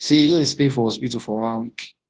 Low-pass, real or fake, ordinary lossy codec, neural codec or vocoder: 9.9 kHz; fake; Opus, 16 kbps; codec, 24 kHz, 0.9 kbps, WavTokenizer, large speech release